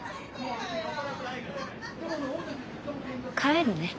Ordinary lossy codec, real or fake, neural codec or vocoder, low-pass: none; real; none; none